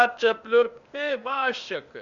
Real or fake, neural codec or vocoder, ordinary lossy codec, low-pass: fake; codec, 16 kHz, 0.8 kbps, ZipCodec; Opus, 64 kbps; 7.2 kHz